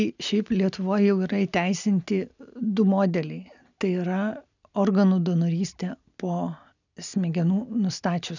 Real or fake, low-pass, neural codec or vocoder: real; 7.2 kHz; none